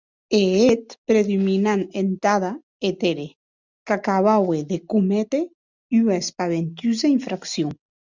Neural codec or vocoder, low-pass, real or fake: none; 7.2 kHz; real